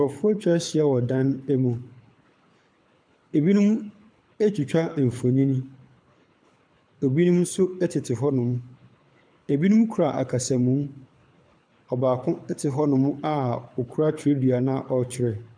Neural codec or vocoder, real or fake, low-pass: codec, 24 kHz, 6 kbps, HILCodec; fake; 9.9 kHz